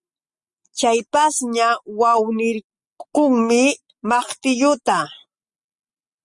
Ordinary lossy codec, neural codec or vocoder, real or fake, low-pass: Opus, 64 kbps; none; real; 10.8 kHz